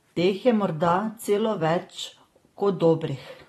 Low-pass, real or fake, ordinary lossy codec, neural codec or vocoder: 19.8 kHz; fake; AAC, 32 kbps; vocoder, 44.1 kHz, 128 mel bands every 256 samples, BigVGAN v2